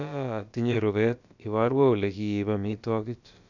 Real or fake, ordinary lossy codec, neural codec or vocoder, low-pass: fake; none; codec, 16 kHz, about 1 kbps, DyCAST, with the encoder's durations; 7.2 kHz